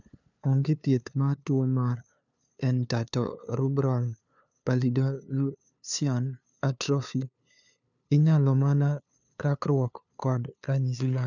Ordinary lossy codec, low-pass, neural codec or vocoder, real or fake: none; 7.2 kHz; codec, 16 kHz, 2 kbps, FunCodec, trained on LibriTTS, 25 frames a second; fake